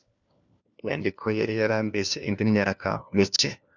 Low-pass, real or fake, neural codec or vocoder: 7.2 kHz; fake; codec, 16 kHz, 1 kbps, FunCodec, trained on LibriTTS, 50 frames a second